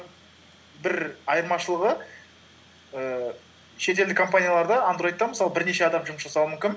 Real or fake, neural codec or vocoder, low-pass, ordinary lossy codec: real; none; none; none